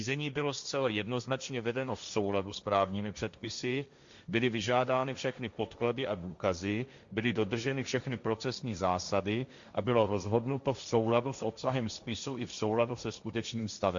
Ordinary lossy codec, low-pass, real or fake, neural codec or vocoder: Opus, 64 kbps; 7.2 kHz; fake; codec, 16 kHz, 1.1 kbps, Voila-Tokenizer